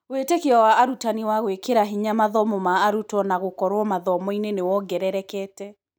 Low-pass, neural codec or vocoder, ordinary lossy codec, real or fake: none; none; none; real